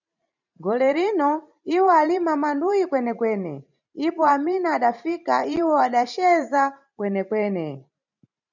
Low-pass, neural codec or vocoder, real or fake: 7.2 kHz; vocoder, 44.1 kHz, 128 mel bands every 512 samples, BigVGAN v2; fake